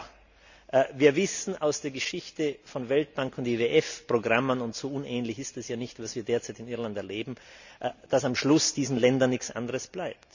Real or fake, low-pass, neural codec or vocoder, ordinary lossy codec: real; 7.2 kHz; none; none